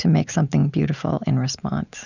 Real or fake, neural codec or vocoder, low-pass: real; none; 7.2 kHz